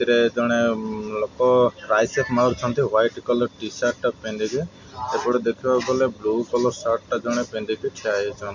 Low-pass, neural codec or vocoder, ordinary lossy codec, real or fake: 7.2 kHz; none; MP3, 48 kbps; real